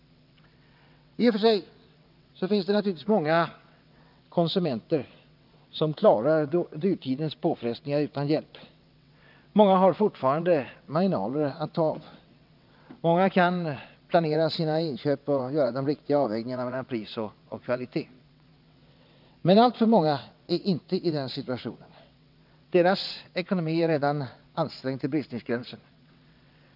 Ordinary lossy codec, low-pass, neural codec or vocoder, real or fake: none; 5.4 kHz; vocoder, 22.05 kHz, 80 mel bands, WaveNeXt; fake